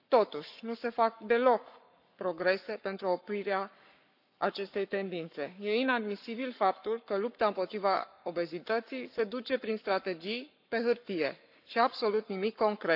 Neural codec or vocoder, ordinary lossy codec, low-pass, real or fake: codec, 44.1 kHz, 7.8 kbps, Pupu-Codec; none; 5.4 kHz; fake